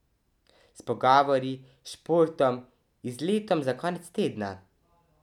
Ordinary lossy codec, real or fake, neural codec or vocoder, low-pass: none; real; none; 19.8 kHz